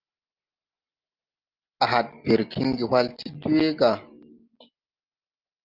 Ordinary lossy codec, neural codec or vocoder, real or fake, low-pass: Opus, 24 kbps; none; real; 5.4 kHz